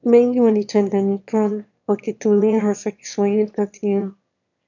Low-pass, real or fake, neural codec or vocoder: 7.2 kHz; fake; autoencoder, 22.05 kHz, a latent of 192 numbers a frame, VITS, trained on one speaker